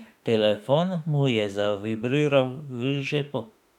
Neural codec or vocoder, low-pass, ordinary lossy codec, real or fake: autoencoder, 48 kHz, 32 numbers a frame, DAC-VAE, trained on Japanese speech; 19.8 kHz; none; fake